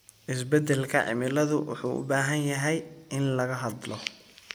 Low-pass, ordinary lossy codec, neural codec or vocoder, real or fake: none; none; none; real